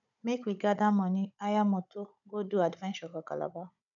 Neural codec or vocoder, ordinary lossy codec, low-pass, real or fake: codec, 16 kHz, 16 kbps, FunCodec, trained on Chinese and English, 50 frames a second; none; 7.2 kHz; fake